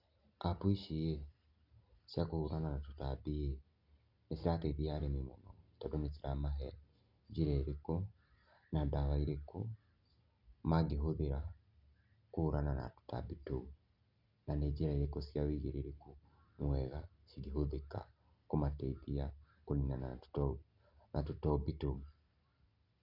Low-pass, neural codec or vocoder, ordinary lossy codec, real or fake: 5.4 kHz; none; none; real